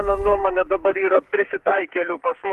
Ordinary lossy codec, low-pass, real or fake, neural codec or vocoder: Opus, 24 kbps; 14.4 kHz; fake; codec, 44.1 kHz, 2.6 kbps, SNAC